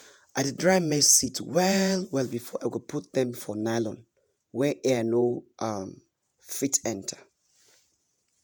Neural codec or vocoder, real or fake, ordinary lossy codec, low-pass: vocoder, 48 kHz, 128 mel bands, Vocos; fake; none; none